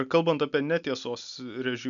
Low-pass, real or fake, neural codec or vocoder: 7.2 kHz; real; none